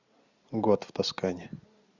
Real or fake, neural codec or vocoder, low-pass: real; none; 7.2 kHz